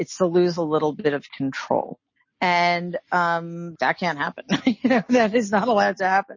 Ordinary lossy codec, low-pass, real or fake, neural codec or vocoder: MP3, 32 kbps; 7.2 kHz; real; none